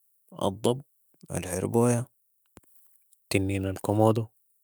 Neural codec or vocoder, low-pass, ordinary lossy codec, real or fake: autoencoder, 48 kHz, 128 numbers a frame, DAC-VAE, trained on Japanese speech; none; none; fake